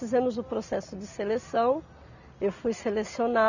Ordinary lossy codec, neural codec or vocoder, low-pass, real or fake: none; none; 7.2 kHz; real